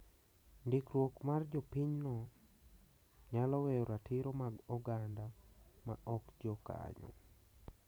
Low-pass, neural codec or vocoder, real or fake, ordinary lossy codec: none; vocoder, 44.1 kHz, 128 mel bands every 512 samples, BigVGAN v2; fake; none